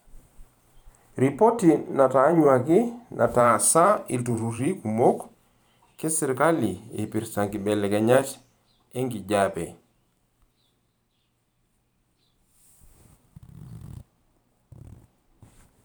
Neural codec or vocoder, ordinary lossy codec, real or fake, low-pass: vocoder, 44.1 kHz, 128 mel bands every 512 samples, BigVGAN v2; none; fake; none